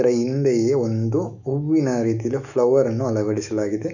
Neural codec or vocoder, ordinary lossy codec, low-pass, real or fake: autoencoder, 48 kHz, 128 numbers a frame, DAC-VAE, trained on Japanese speech; none; 7.2 kHz; fake